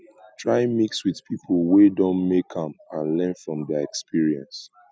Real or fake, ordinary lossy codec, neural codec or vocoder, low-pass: real; none; none; none